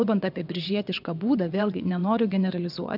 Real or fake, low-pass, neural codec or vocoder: fake; 5.4 kHz; vocoder, 22.05 kHz, 80 mel bands, WaveNeXt